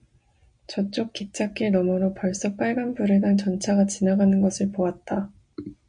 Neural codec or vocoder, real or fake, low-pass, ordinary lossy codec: none; real; 9.9 kHz; MP3, 48 kbps